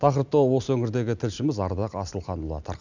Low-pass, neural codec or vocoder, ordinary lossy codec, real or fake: 7.2 kHz; none; none; real